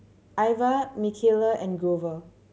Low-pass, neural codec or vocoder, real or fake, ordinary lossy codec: none; none; real; none